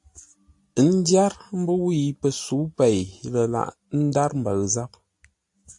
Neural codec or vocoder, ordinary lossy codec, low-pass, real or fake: none; AAC, 64 kbps; 10.8 kHz; real